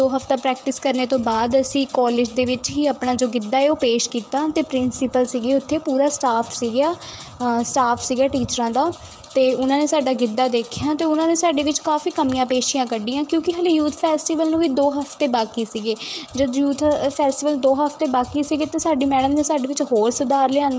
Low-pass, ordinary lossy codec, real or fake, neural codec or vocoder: none; none; fake; codec, 16 kHz, 16 kbps, FreqCodec, smaller model